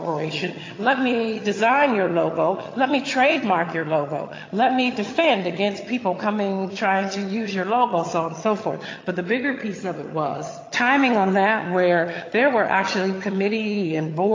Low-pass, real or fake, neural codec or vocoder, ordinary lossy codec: 7.2 kHz; fake; vocoder, 22.05 kHz, 80 mel bands, HiFi-GAN; AAC, 32 kbps